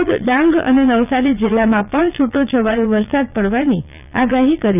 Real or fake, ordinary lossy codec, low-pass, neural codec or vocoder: fake; none; 3.6 kHz; vocoder, 22.05 kHz, 80 mel bands, Vocos